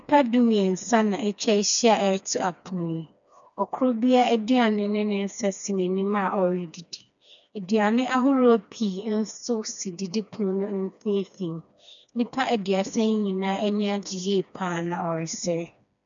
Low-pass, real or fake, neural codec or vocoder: 7.2 kHz; fake; codec, 16 kHz, 2 kbps, FreqCodec, smaller model